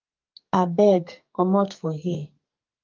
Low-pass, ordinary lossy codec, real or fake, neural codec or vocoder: 7.2 kHz; Opus, 24 kbps; fake; codec, 44.1 kHz, 2.6 kbps, SNAC